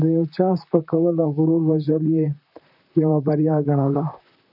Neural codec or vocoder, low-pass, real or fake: vocoder, 44.1 kHz, 128 mel bands, Pupu-Vocoder; 5.4 kHz; fake